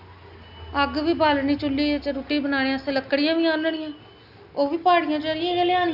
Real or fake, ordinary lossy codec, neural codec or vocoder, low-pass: real; none; none; 5.4 kHz